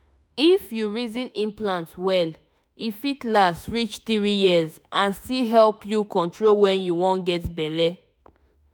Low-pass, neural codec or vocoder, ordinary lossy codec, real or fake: none; autoencoder, 48 kHz, 32 numbers a frame, DAC-VAE, trained on Japanese speech; none; fake